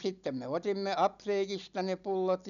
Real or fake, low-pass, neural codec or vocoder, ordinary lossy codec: real; 7.2 kHz; none; none